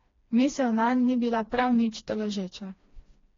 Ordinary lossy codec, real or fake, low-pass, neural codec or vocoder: AAC, 32 kbps; fake; 7.2 kHz; codec, 16 kHz, 2 kbps, FreqCodec, smaller model